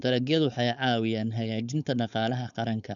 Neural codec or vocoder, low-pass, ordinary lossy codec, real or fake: codec, 16 kHz, 4.8 kbps, FACodec; 7.2 kHz; none; fake